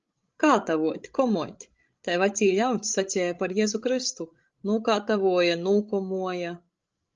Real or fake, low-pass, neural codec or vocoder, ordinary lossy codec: fake; 7.2 kHz; codec, 16 kHz, 16 kbps, FreqCodec, larger model; Opus, 24 kbps